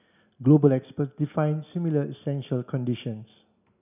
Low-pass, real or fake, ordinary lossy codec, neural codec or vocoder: 3.6 kHz; real; none; none